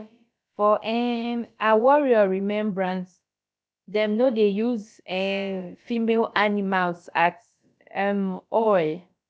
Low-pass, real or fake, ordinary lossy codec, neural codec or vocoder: none; fake; none; codec, 16 kHz, about 1 kbps, DyCAST, with the encoder's durations